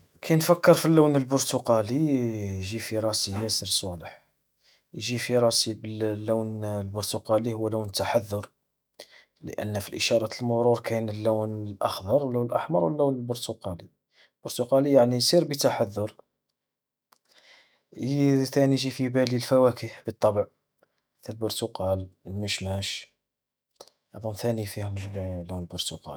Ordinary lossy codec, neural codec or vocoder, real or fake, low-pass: none; autoencoder, 48 kHz, 128 numbers a frame, DAC-VAE, trained on Japanese speech; fake; none